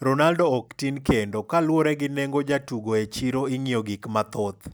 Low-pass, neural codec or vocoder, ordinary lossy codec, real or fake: none; none; none; real